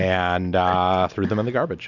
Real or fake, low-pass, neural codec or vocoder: real; 7.2 kHz; none